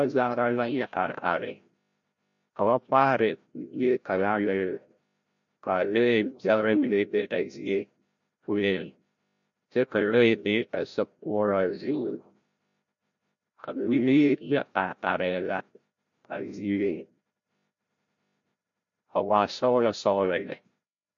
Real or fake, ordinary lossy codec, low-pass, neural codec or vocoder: fake; MP3, 48 kbps; 7.2 kHz; codec, 16 kHz, 0.5 kbps, FreqCodec, larger model